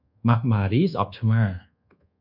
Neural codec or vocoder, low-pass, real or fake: codec, 24 kHz, 1.2 kbps, DualCodec; 5.4 kHz; fake